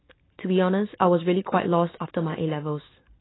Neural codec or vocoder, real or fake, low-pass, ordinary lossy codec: none; real; 7.2 kHz; AAC, 16 kbps